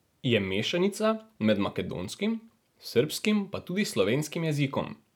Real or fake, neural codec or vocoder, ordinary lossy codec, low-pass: real; none; none; 19.8 kHz